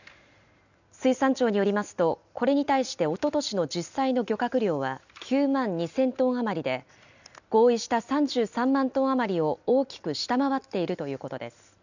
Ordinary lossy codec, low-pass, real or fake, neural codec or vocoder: none; 7.2 kHz; real; none